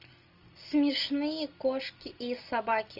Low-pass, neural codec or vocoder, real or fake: 5.4 kHz; none; real